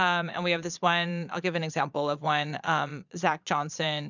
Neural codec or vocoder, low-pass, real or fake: vocoder, 22.05 kHz, 80 mel bands, Vocos; 7.2 kHz; fake